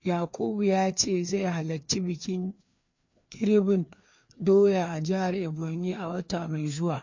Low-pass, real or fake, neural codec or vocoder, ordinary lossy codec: 7.2 kHz; fake; codec, 16 kHz, 2 kbps, FreqCodec, larger model; MP3, 48 kbps